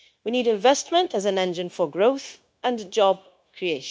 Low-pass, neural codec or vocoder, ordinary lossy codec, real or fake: none; codec, 16 kHz, 0.9 kbps, LongCat-Audio-Codec; none; fake